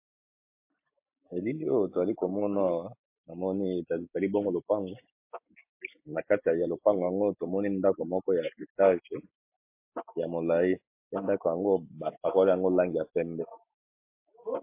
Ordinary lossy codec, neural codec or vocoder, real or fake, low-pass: MP3, 24 kbps; none; real; 3.6 kHz